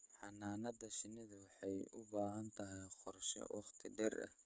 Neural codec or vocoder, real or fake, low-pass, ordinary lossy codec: codec, 16 kHz, 16 kbps, FreqCodec, smaller model; fake; none; none